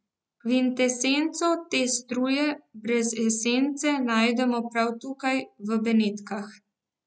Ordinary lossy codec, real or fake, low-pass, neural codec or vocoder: none; real; none; none